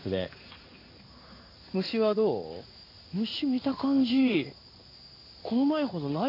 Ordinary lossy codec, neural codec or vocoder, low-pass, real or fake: AAC, 32 kbps; codec, 16 kHz in and 24 kHz out, 1 kbps, XY-Tokenizer; 5.4 kHz; fake